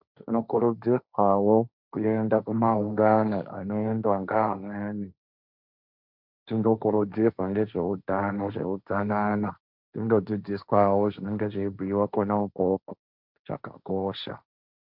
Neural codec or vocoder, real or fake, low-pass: codec, 16 kHz, 1.1 kbps, Voila-Tokenizer; fake; 5.4 kHz